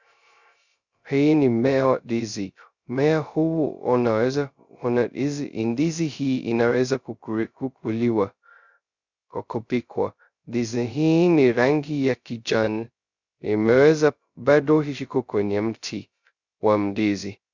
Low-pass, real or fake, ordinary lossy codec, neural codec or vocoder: 7.2 kHz; fake; Opus, 64 kbps; codec, 16 kHz, 0.2 kbps, FocalCodec